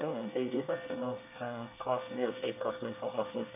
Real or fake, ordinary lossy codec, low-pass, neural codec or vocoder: fake; none; 3.6 kHz; codec, 24 kHz, 1 kbps, SNAC